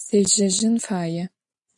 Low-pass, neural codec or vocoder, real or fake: 10.8 kHz; none; real